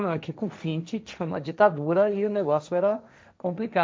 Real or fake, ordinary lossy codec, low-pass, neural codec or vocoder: fake; none; none; codec, 16 kHz, 1.1 kbps, Voila-Tokenizer